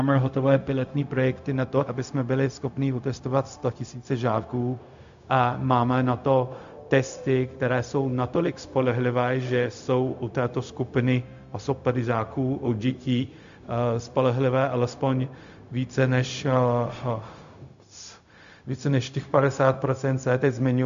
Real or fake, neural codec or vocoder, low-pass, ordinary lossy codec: fake; codec, 16 kHz, 0.4 kbps, LongCat-Audio-Codec; 7.2 kHz; MP3, 64 kbps